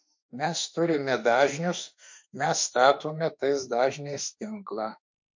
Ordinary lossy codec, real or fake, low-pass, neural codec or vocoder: MP3, 48 kbps; fake; 7.2 kHz; autoencoder, 48 kHz, 32 numbers a frame, DAC-VAE, trained on Japanese speech